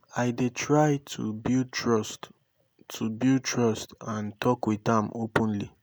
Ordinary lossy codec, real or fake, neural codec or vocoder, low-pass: none; real; none; none